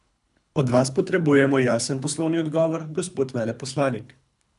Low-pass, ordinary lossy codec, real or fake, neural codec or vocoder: 10.8 kHz; none; fake; codec, 24 kHz, 3 kbps, HILCodec